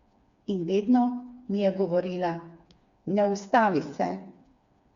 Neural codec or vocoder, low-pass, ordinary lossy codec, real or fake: codec, 16 kHz, 4 kbps, FreqCodec, smaller model; 7.2 kHz; Opus, 64 kbps; fake